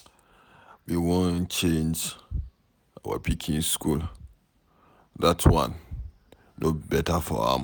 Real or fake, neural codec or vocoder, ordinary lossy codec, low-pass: real; none; none; none